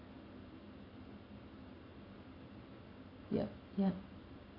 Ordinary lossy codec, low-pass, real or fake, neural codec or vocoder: AAC, 24 kbps; 5.4 kHz; real; none